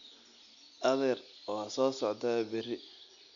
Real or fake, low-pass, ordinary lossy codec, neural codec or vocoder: real; 7.2 kHz; none; none